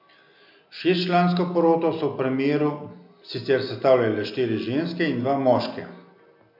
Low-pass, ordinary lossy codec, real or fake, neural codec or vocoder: 5.4 kHz; MP3, 48 kbps; real; none